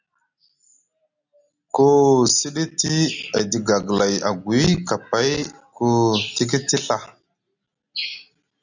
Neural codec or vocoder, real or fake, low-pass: none; real; 7.2 kHz